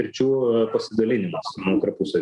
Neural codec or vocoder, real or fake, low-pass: none; real; 10.8 kHz